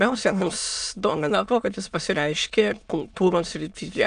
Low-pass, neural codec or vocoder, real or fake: 9.9 kHz; autoencoder, 22.05 kHz, a latent of 192 numbers a frame, VITS, trained on many speakers; fake